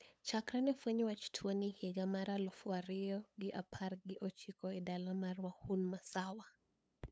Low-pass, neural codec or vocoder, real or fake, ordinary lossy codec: none; codec, 16 kHz, 8 kbps, FunCodec, trained on LibriTTS, 25 frames a second; fake; none